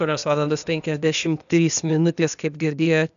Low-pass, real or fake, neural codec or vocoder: 7.2 kHz; fake; codec, 16 kHz, 0.8 kbps, ZipCodec